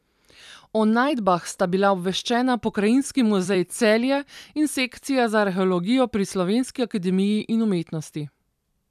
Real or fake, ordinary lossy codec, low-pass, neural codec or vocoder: fake; none; 14.4 kHz; vocoder, 44.1 kHz, 128 mel bands every 256 samples, BigVGAN v2